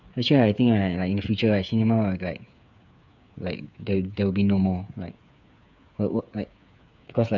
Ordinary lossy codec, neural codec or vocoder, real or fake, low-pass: none; codec, 16 kHz, 8 kbps, FreqCodec, smaller model; fake; 7.2 kHz